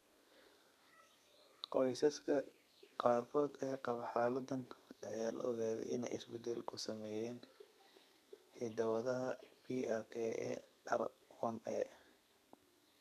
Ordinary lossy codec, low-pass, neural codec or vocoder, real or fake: none; 14.4 kHz; codec, 32 kHz, 1.9 kbps, SNAC; fake